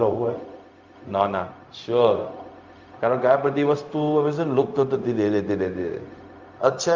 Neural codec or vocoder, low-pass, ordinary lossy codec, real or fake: codec, 16 kHz, 0.4 kbps, LongCat-Audio-Codec; 7.2 kHz; Opus, 24 kbps; fake